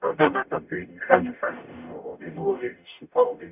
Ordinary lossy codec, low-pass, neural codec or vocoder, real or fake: none; 3.6 kHz; codec, 44.1 kHz, 0.9 kbps, DAC; fake